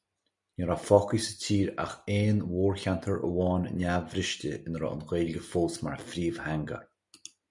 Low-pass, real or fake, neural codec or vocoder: 10.8 kHz; real; none